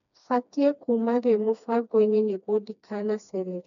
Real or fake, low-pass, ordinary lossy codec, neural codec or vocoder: fake; 7.2 kHz; none; codec, 16 kHz, 2 kbps, FreqCodec, smaller model